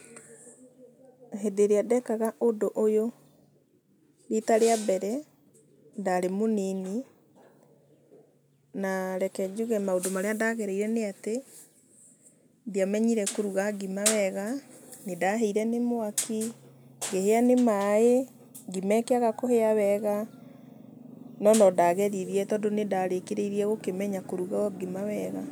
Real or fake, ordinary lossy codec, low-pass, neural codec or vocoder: real; none; none; none